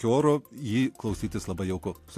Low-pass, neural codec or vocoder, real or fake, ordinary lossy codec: 14.4 kHz; none; real; AAC, 64 kbps